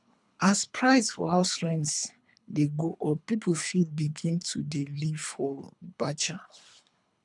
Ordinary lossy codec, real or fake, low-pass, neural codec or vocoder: none; fake; 10.8 kHz; codec, 24 kHz, 3 kbps, HILCodec